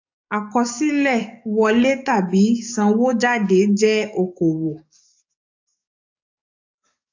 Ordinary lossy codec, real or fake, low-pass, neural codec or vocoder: AAC, 48 kbps; fake; 7.2 kHz; codec, 44.1 kHz, 7.8 kbps, DAC